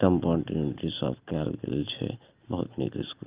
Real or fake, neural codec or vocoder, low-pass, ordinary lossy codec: real; none; 3.6 kHz; Opus, 32 kbps